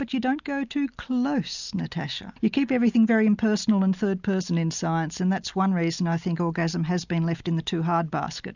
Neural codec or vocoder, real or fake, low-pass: none; real; 7.2 kHz